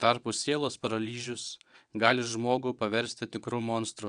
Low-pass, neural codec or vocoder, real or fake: 9.9 kHz; vocoder, 22.05 kHz, 80 mel bands, WaveNeXt; fake